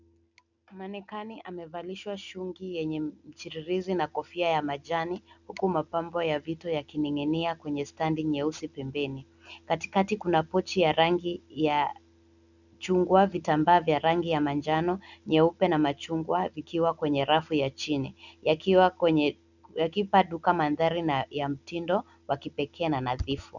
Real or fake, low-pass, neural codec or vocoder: real; 7.2 kHz; none